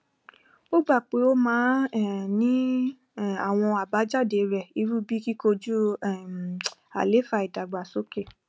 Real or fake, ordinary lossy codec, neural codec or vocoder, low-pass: real; none; none; none